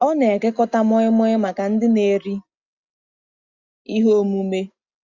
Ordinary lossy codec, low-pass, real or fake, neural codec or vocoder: Opus, 64 kbps; 7.2 kHz; real; none